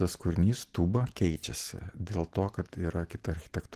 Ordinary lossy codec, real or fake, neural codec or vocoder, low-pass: Opus, 16 kbps; real; none; 14.4 kHz